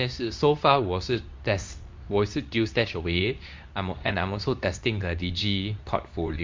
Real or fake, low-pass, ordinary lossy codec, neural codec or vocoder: fake; 7.2 kHz; MP3, 64 kbps; codec, 24 kHz, 0.9 kbps, WavTokenizer, medium speech release version 2